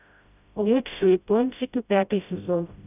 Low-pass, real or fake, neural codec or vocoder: 3.6 kHz; fake; codec, 16 kHz, 0.5 kbps, FreqCodec, smaller model